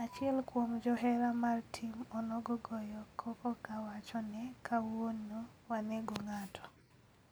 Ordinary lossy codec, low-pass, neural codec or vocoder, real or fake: none; none; none; real